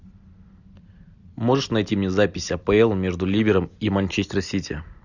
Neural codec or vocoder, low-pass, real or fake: none; 7.2 kHz; real